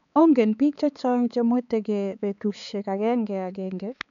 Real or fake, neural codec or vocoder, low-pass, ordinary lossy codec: fake; codec, 16 kHz, 4 kbps, X-Codec, HuBERT features, trained on balanced general audio; 7.2 kHz; none